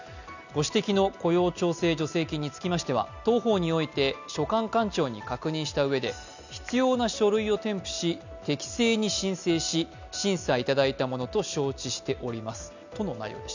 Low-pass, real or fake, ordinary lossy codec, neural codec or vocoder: 7.2 kHz; real; none; none